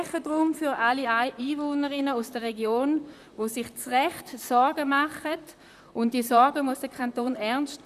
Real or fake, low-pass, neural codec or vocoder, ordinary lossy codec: fake; 14.4 kHz; vocoder, 44.1 kHz, 128 mel bands, Pupu-Vocoder; none